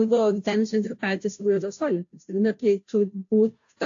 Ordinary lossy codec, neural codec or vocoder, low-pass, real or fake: AAC, 48 kbps; codec, 16 kHz, 0.5 kbps, FunCodec, trained on Chinese and English, 25 frames a second; 7.2 kHz; fake